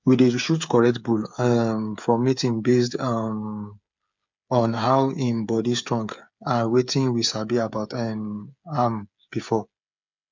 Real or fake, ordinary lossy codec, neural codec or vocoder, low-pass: fake; MP3, 64 kbps; codec, 16 kHz, 8 kbps, FreqCodec, smaller model; 7.2 kHz